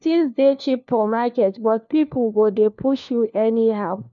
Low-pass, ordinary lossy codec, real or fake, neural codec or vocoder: 7.2 kHz; none; fake; codec, 16 kHz, 1 kbps, FunCodec, trained on LibriTTS, 50 frames a second